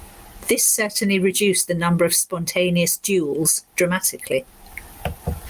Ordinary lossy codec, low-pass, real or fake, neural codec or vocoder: Opus, 32 kbps; 14.4 kHz; real; none